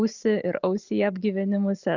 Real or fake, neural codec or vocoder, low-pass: real; none; 7.2 kHz